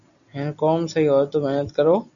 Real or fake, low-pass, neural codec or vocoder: real; 7.2 kHz; none